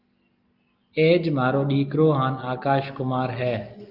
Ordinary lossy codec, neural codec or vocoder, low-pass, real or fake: Opus, 24 kbps; none; 5.4 kHz; real